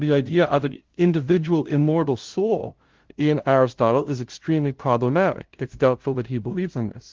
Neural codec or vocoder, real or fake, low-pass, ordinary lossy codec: codec, 16 kHz, 0.5 kbps, FunCodec, trained on LibriTTS, 25 frames a second; fake; 7.2 kHz; Opus, 16 kbps